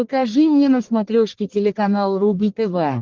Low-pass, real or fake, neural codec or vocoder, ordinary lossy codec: 7.2 kHz; fake; codec, 44.1 kHz, 1.7 kbps, Pupu-Codec; Opus, 16 kbps